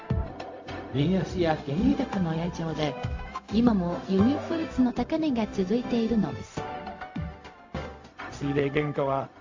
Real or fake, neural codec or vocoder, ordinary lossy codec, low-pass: fake; codec, 16 kHz, 0.4 kbps, LongCat-Audio-Codec; AAC, 48 kbps; 7.2 kHz